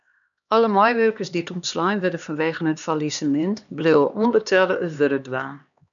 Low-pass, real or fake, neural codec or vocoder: 7.2 kHz; fake; codec, 16 kHz, 2 kbps, X-Codec, HuBERT features, trained on LibriSpeech